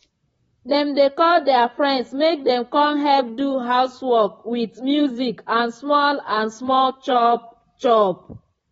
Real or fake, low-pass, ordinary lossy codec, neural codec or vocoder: fake; 19.8 kHz; AAC, 24 kbps; vocoder, 44.1 kHz, 128 mel bands, Pupu-Vocoder